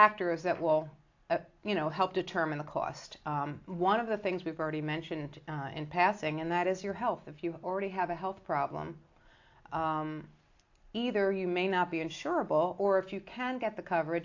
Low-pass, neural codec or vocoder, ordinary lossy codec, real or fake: 7.2 kHz; none; AAC, 48 kbps; real